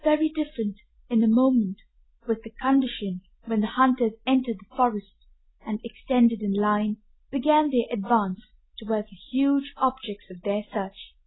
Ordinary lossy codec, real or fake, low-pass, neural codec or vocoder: AAC, 16 kbps; real; 7.2 kHz; none